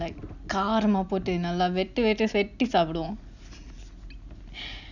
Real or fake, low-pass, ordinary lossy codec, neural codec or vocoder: real; 7.2 kHz; none; none